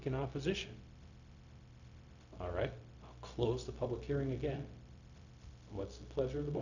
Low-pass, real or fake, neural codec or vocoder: 7.2 kHz; fake; codec, 16 kHz, 0.4 kbps, LongCat-Audio-Codec